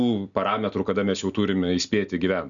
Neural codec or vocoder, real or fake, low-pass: none; real; 7.2 kHz